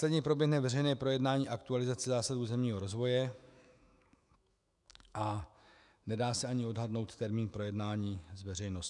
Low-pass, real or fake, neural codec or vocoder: 10.8 kHz; fake; autoencoder, 48 kHz, 128 numbers a frame, DAC-VAE, trained on Japanese speech